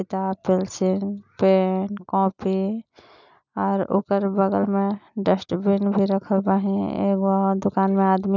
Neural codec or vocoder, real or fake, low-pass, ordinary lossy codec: none; real; 7.2 kHz; none